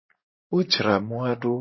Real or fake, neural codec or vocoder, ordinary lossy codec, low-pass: fake; vocoder, 44.1 kHz, 128 mel bands every 512 samples, BigVGAN v2; MP3, 24 kbps; 7.2 kHz